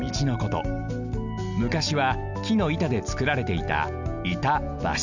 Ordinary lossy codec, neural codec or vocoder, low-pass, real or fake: none; none; 7.2 kHz; real